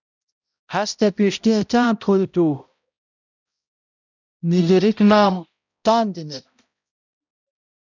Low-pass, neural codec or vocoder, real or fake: 7.2 kHz; codec, 16 kHz, 0.5 kbps, X-Codec, HuBERT features, trained on balanced general audio; fake